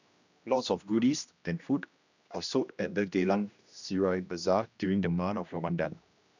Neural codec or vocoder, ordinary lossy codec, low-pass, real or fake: codec, 16 kHz, 1 kbps, X-Codec, HuBERT features, trained on general audio; none; 7.2 kHz; fake